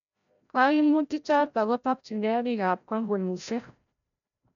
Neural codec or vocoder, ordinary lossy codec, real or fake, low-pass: codec, 16 kHz, 0.5 kbps, FreqCodec, larger model; none; fake; 7.2 kHz